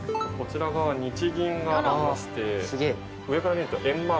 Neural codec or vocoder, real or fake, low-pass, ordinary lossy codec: none; real; none; none